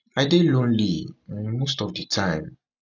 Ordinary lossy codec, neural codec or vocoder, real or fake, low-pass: none; none; real; 7.2 kHz